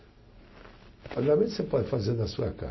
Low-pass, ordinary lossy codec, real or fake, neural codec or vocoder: 7.2 kHz; MP3, 24 kbps; real; none